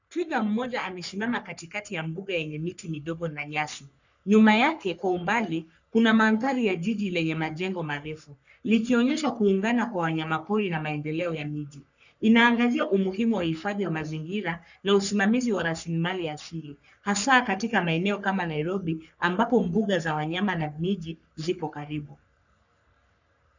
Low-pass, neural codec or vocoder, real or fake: 7.2 kHz; codec, 44.1 kHz, 3.4 kbps, Pupu-Codec; fake